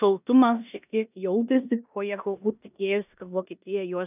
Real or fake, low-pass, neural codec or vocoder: fake; 3.6 kHz; codec, 16 kHz in and 24 kHz out, 0.9 kbps, LongCat-Audio-Codec, four codebook decoder